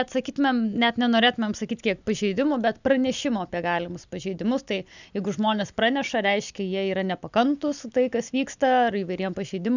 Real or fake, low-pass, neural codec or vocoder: real; 7.2 kHz; none